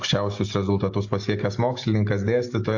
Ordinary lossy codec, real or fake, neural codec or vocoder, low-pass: AAC, 48 kbps; real; none; 7.2 kHz